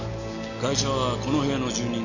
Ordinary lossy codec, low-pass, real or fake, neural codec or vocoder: none; 7.2 kHz; real; none